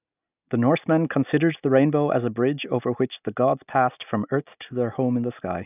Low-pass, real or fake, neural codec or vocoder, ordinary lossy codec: 3.6 kHz; real; none; none